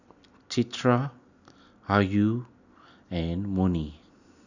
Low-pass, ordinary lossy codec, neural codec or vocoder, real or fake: 7.2 kHz; none; none; real